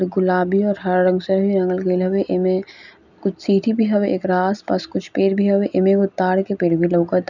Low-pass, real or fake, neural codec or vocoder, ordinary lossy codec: 7.2 kHz; real; none; none